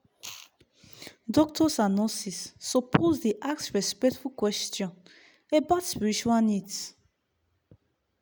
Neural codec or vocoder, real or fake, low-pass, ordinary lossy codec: none; real; none; none